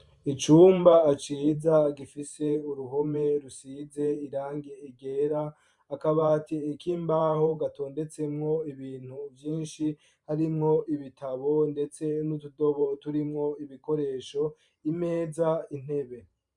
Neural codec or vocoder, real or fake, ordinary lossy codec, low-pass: vocoder, 44.1 kHz, 128 mel bands every 512 samples, BigVGAN v2; fake; MP3, 96 kbps; 10.8 kHz